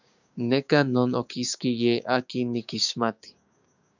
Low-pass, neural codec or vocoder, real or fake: 7.2 kHz; codec, 16 kHz, 6 kbps, DAC; fake